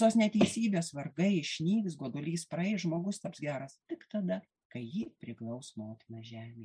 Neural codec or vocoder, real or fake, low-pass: none; real; 9.9 kHz